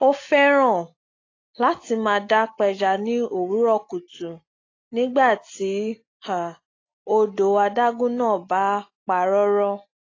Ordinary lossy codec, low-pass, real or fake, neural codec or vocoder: AAC, 32 kbps; 7.2 kHz; real; none